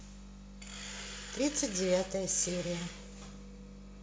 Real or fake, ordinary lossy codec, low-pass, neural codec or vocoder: real; none; none; none